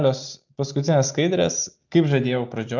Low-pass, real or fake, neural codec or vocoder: 7.2 kHz; real; none